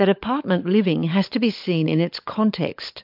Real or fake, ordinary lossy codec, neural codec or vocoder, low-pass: fake; MP3, 48 kbps; codec, 16 kHz, 16 kbps, FunCodec, trained on LibriTTS, 50 frames a second; 5.4 kHz